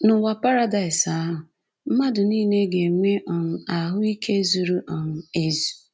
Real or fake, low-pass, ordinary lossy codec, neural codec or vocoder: real; none; none; none